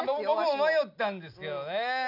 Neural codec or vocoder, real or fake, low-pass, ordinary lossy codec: none; real; 5.4 kHz; none